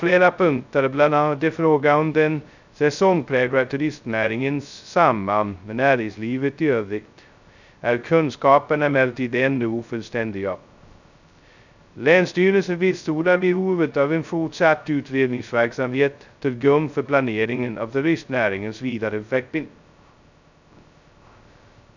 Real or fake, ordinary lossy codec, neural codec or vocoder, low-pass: fake; none; codec, 16 kHz, 0.2 kbps, FocalCodec; 7.2 kHz